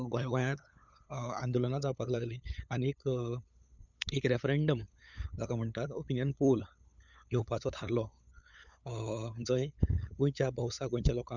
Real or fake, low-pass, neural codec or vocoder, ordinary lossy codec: fake; 7.2 kHz; codec, 16 kHz, 8 kbps, FunCodec, trained on LibriTTS, 25 frames a second; none